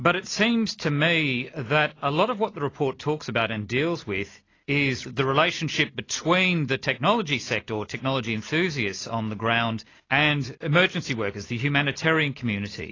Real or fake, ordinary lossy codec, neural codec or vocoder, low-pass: real; AAC, 32 kbps; none; 7.2 kHz